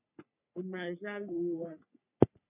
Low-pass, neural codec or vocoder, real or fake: 3.6 kHz; codec, 44.1 kHz, 3.4 kbps, Pupu-Codec; fake